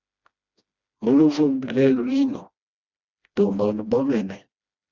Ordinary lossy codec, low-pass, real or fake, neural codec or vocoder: Opus, 64 kbps; 7.2 kHz; fake; codec, 16 kHz, 1 kbps, FreqCodec, smaller model